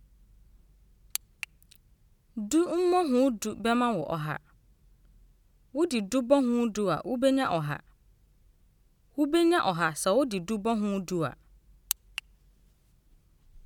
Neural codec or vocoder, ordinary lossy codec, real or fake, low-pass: none; none; real; 19.8 kHz